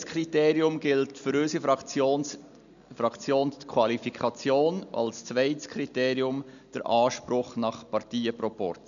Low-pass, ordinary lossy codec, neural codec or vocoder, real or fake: 7.2 kHz; none; none; real